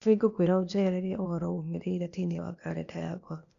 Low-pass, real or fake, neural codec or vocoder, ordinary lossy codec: 7.2 kHz; fake; codec, 16 kHz, 0.8 kbps, ZipCodec; none